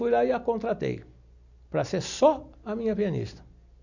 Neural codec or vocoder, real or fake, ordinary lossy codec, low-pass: none; real; none; 7.2 kHz